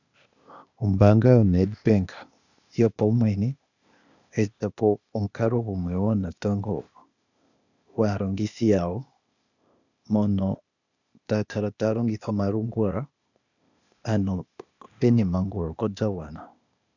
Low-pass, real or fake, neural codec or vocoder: 7.2 kHz; fake; codec, 16 kHz, 0.8 kbps, ZipCodec